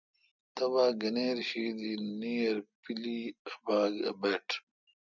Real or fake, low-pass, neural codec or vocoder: real; 7.2 kHz; none